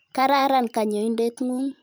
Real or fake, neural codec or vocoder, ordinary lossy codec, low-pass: fake; vocoder, 44.1 kHz, 128 mel bands every 512 samples, BigVGAN v2; none; none